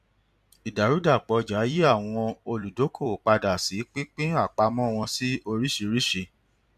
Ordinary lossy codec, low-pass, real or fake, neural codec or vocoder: none; 14.4 kHz; fake; vocoder, 44.1 kHz, 128 mel bands every 256 samples, BigVGAN v2